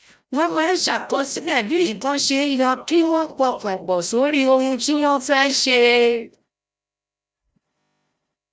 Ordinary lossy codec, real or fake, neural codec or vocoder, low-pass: none; fake; codec, 16 kHz, 0.5 kbps, FreqCodec, larger model; none